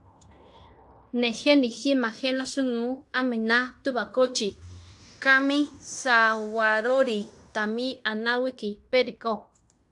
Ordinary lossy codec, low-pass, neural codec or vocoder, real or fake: AAC, 64 kbps; 10.8 kHz; codec, 16 kHz in and 24 kHz out, 0.9 kbps, LongCat-Audio-Codec, fine tuned four codebook decoder; fake